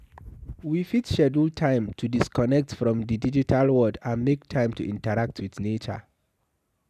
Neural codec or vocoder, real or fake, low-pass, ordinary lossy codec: none; real; 14.4 kHz; none